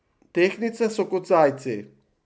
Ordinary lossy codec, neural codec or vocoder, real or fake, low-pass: none; none; real; none